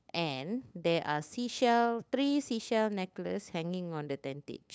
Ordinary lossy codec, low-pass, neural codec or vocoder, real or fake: none; none; codec, 16 kHz, 8 kbps, FunCodec, trained on LibriTTS, 25 frames a second; fake